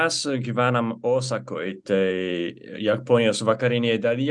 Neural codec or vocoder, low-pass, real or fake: none; 10.8 kHz; real